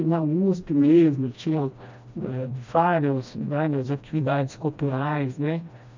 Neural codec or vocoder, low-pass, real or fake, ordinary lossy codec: codec, 16 kHz, 1 kbps, FreqCodec, smaller model; 7.2 kHz; fake; MP3, 64 kbps